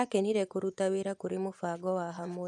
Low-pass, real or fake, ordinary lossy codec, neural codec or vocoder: none; fake; none; vocoder, 24 kHz, 100 mel bands, Vocos